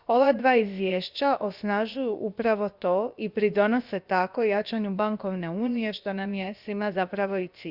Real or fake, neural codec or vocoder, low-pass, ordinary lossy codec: fake; codec, 16 kHz, about 1 kbps, DyCAST, with the encoder's durations; 5.4 kHz; Opus, 64 kbps